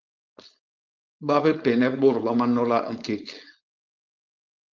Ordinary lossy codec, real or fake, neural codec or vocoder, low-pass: Opus, 32 kbps; fake; codec, 16 kHz, 4.8 kbps, FACodec; 7.2 kHz